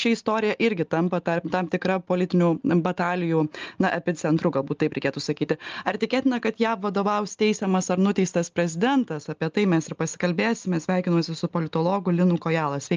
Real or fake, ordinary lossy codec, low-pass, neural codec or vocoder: real; Opus, 24 kbps; 7.2 kHz; none